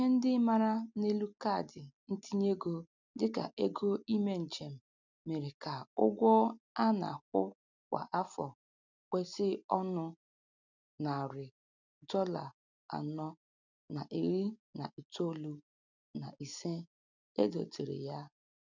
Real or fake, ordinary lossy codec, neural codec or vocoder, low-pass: real; none; none; 7.2 kHz